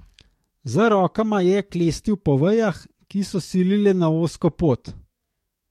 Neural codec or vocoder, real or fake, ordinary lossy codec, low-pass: codec, 44.1 kHz, 7.8 kbps, DAC; fake; MP3, 64 kbps; 19.8 kHz